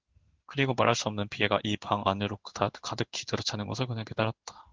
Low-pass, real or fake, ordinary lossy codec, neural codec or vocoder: 7.2 kHz; fake; Opus, 16 kbps; codec, 16 kHz in and 24 kHz out, 1 kbps, XY-Tokenizer